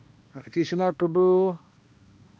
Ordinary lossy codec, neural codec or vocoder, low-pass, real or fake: none; codec, 16 kHz, 1 kbps, X-Codec, HuBERT features, trained on balanced general audio; none; fake